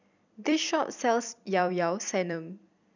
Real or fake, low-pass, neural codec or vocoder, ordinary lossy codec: fake; 7.2 kHz; vocoder, 44.1 kHz, 128 mel bands every 256 samples, BigVGAN v2; none